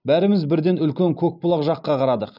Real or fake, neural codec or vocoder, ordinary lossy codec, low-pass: real; none; none; 5.4 kHz